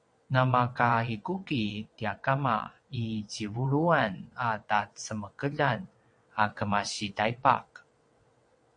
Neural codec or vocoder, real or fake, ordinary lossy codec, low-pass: vocoder, 22.05 kHz, 80 mel bands, WaveNeXt; fake; MP3, 48 kbps; 9.9 kHz